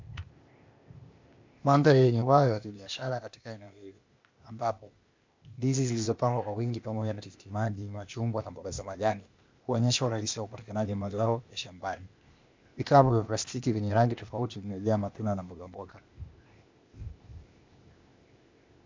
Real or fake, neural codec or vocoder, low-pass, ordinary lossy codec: fake; codec, 16 kHz, 0.8 kbps, ZipCodec; 7.2 kHz; MP3, 64 kbps